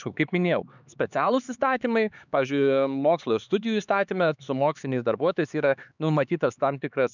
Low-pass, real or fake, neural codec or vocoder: 7.2 kHz; fake; codec, 16 kHz, 4 kbps, X-Codec, HuBERT features, trained on LibriSpeech